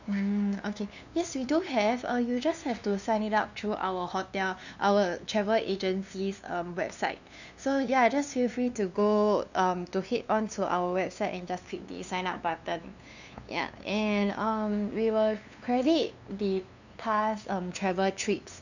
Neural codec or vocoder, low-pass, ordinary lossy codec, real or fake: codec, 16 kHz, 2 kbps, FunCodec, trained on LibriTTS, 25 frames a second; 7.2 kHz; none; fake